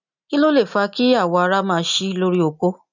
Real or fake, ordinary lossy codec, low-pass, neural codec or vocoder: real; none; 7.2 kHz; none